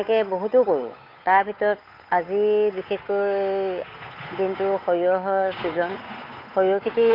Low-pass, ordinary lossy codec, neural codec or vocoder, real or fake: 5.4 kHz; AAC, 32 kbps; codec, 16 kHz, 8 kbps, FunCodec, trained on Chinese and English, 25 frames a second; fake